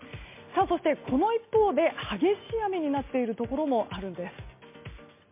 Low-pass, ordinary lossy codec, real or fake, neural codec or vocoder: 3.6 kHz; MP3, 24 kbps; real; none